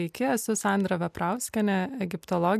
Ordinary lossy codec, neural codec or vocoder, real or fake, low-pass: MP3, 96 kbps; none; real; 14.4 kHz